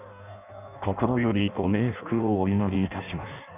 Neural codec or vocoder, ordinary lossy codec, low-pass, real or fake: codec, 16 kHz in and 24 kHz out, 0.6 kbps, FireRedTTS-2 codec; none; 3.6 kHz; fake